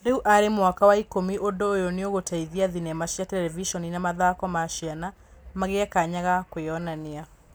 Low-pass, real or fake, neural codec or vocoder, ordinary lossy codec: none; real; none; none